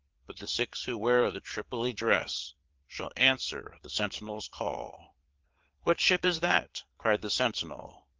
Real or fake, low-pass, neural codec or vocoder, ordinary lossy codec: fake; 7.2 kHz; vocoder, 22.05 kHz, 80 mel bands, Vocos; Opus, 16 kbps